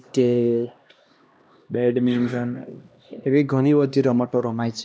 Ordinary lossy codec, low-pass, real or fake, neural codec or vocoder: none; none; fake; codec, 16 kHz, 1 kbps, X-Codec, HuBERT features, trained on LibriSpeech